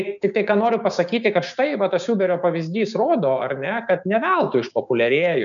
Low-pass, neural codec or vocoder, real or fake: 7.2 kHz; codec, 16 kHz, 6 kbps, DAC; fake